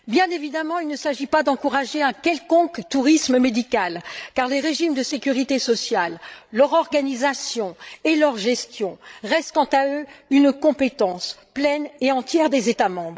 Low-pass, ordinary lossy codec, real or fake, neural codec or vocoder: none; none; fake; codec, 16 kHz, 16 kbps, FreqCodec, larger model